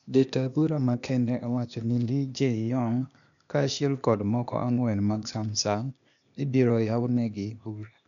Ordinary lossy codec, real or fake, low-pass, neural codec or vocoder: none; fake; 7.2 kHz; codec, 16 kHz, 0.8 kbps, ZipCodec